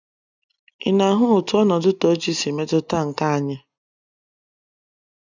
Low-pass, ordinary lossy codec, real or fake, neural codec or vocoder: 7.2 kHz; none; real; none